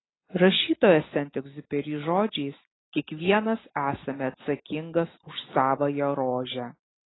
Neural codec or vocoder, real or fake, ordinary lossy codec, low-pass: none; real; AAC, 16 kbps; 7.2 kHz